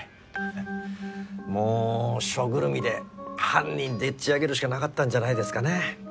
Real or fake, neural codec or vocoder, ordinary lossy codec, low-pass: real; none; none; none